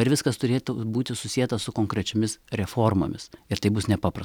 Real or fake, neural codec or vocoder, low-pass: real; none; 19.8 kHz